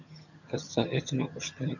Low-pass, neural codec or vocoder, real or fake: 7.2 kHz; vocoder, 22.05 kHz, 80 mel bands, HiFi-GAN; fake